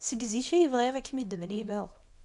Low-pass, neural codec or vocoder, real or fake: 10.8 kHz; codec, 24 kHz, 0.9 kbps, WavTokenizer, small release; fake